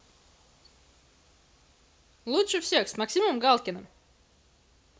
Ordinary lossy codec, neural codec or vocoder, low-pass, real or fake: none; none; none; real